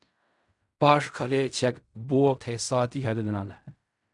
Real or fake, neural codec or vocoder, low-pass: fake; codec, 16 kHz in and 24 kHz out, 0.4 kbps, LongCat-Audio-Codec, fine tuned four codebook decoder; 10.8 kHz